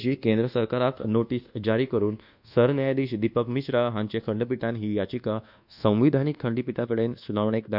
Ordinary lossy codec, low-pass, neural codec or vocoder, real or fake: none; 5.4 kHz; codec, 24 kHz, 1.2 kbps, DualCodec; fake